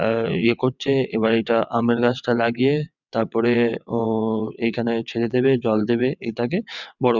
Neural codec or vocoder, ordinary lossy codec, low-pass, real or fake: vocoder, 22.05 kHz, 80 mel bands, WaveNeXt; none; 7.2 kHz; fake